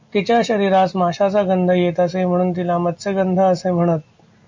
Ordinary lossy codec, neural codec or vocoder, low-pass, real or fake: MP3, 48 kbps; none; 7.2 kHz; real